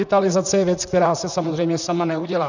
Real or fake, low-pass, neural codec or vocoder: fake; 7.2 kHz; vocoder, 44.1 kHz, 128 mel bands, Pupu-Vocoder